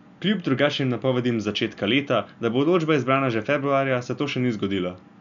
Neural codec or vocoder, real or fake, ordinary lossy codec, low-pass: none; real; none; 7.2 kHz